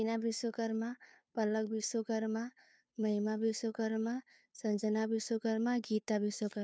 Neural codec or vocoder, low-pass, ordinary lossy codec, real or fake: codec, 16 kHz, 2 kbps, FunCodec, trained on Chinese and English, 25 frames a second; none; none; fake